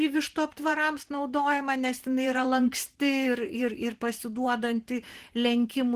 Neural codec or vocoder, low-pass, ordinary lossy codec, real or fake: vocoder, 44.1 kHz, 128 mel bands every 512 samples, BigVGAN v2; 14.4 kHz; Opus, 16 kbps; fake